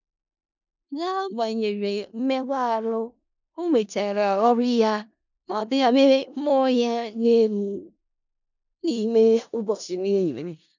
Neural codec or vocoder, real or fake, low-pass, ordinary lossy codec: codec, 16 kHz in and 24 kHz out, 0.4 kbps, LongCat-Audio-Codec, four codebook decoder; fake; 7.2 kHz; none